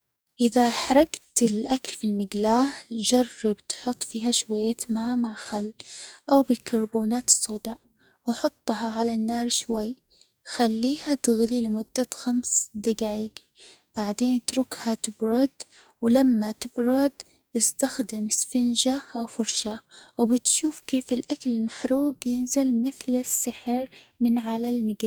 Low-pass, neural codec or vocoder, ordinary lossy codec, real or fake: none; codec, 44.1 kHz, 2.6 kbps, DAC; none; fake